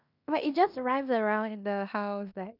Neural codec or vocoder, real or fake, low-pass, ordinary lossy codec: codec, 16 kHz in and 24 kHz out, 0.9 kbps, LongCat-Audio-Codec, fine tuned four codebook decoder; fake; 5.4 kHz; none